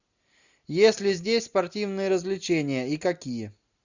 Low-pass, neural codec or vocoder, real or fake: 7.2 kHz; none; real